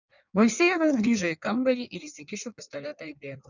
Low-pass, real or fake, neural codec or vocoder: 7.2 kHz; fake; codec, 16 kHz in and 24 kHz out, 1.1 kbps, FireRedTTS-2 codec